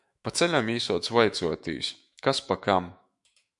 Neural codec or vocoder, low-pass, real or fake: autoencoder, 48 kHz, 128 numbers a frame, DAC-VAE, trained on Japanese speech; 10.8 kHz; fake